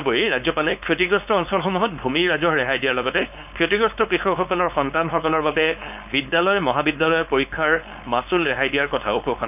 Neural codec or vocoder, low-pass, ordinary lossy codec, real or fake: codec, 16 kHz, 4.8 kbps, FACodec; 3.6 kHz; none; fake